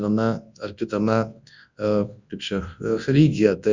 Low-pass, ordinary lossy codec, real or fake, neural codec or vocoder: 7.2 kHz; Opus, 64 kbps; fake; codec, 24 kHz, 0.9 kbps, WavTokenizer, large speech release